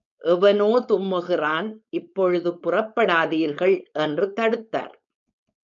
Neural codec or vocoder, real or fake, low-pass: codec, 16 kHz, 4.8 kbps, FACodec; fake; 7.2 kHz